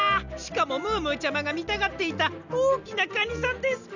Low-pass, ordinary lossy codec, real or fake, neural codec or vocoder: 7.2 kHz; none; real; none